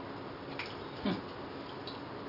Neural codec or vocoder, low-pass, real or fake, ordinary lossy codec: none; 5.4 kHz; real; none